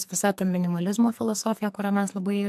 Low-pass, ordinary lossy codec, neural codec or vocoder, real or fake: 14.4 kHz; AAC, 96 kbps; codec, 44.1 kHz, 2.6 kbps, SNAC; fake